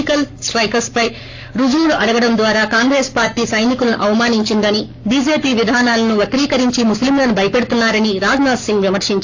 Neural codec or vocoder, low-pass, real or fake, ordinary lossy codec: codec, 44.1 kHz, 7.8 kbps, Pupu-Codec; 7.2 kHz; fake; AAC, 48 kbps